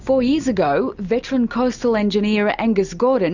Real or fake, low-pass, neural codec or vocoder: real; 7.2 kHz; none